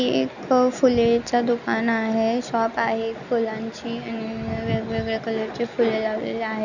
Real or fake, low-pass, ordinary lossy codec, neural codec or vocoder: real; 7.2 kHz; none; none